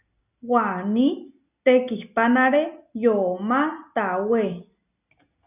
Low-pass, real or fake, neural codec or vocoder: 3.6 kHz; real; none